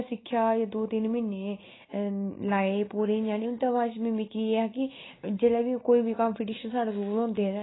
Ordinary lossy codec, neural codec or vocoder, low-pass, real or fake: AAC, 16 kbps; none; 7.2 kHz; real